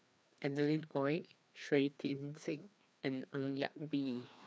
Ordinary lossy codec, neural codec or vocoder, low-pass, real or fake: none; codec, 16 kHz, 2 kbps, FreqCodec, larger model; none; fake